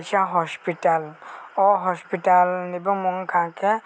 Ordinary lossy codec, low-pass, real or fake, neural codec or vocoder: none; none; real; none